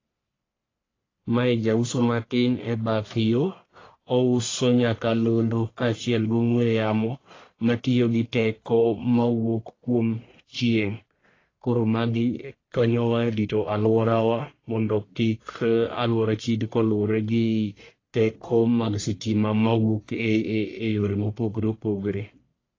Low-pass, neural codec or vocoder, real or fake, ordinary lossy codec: 7.2 kHz; codec, 44.1 kHz, 1.7 kbps, Pupu-Codec; fake; AAC, 32 kbps